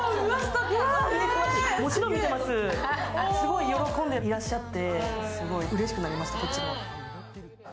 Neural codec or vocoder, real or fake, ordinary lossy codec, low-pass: none; real; none; none